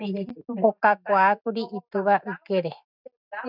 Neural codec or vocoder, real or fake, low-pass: none; real; 5.4 kHz